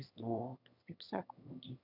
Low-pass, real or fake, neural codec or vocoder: 5.4 kHz; fake; autoencoder, 22.05 kHz, a latent of 192 numbers a frame, VITS, trained on one speaker